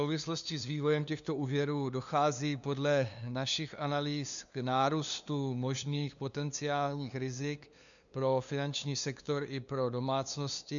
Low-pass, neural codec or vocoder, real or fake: 7.2 kHz; codec, 16 kHz, 2 kbps, FunCodec, trained on LibriTTS, 25 frames a second; fake